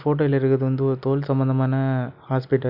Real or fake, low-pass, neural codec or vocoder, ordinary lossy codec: real; 5.4 kHz; none; none